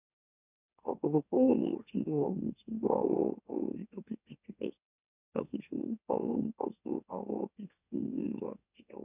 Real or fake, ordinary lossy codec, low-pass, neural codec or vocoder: fake; none; 3.6 kHz; autoencoder, 44.1 kHz, a latent of 192 numbers a frame, MeloTTS